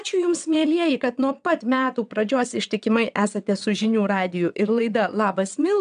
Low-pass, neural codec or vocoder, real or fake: 9.9 kHz; vocoder, 22.05 kHz, 80 mel bands, WaveNeXt; fake